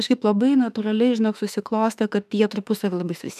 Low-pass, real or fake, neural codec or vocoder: 14.4 kHz; fake; autoencoder, 48 kHz, 32 numbers a frame, DAC-VAE, trained on Japanese speech